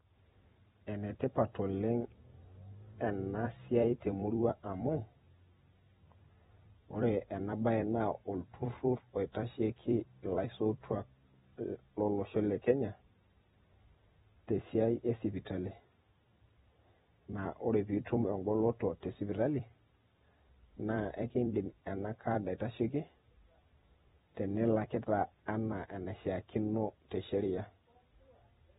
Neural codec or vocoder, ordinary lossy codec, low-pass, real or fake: vocoder, 44.1 kHz, 128 mel bands every 256 samples, BigVGAN v2; AAC, 16 kbps; 19.8 kHz; fake